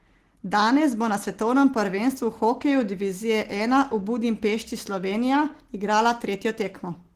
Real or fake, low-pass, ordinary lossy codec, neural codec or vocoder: real; 14.4 kHz; Opus, 16 kbps; none